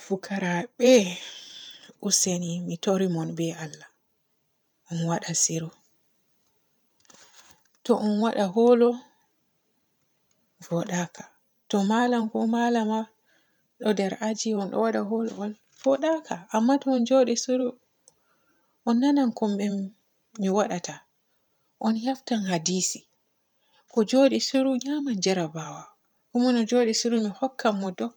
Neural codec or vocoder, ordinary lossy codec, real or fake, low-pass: vocoder, 44.1 kHz, 128 mel bands every 512 samples, BigVGAN v2; none; fake; 19.8 kHz